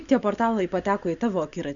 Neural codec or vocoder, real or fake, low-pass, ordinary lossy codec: none; real; 7.2 kHz; Opus, 64 kbps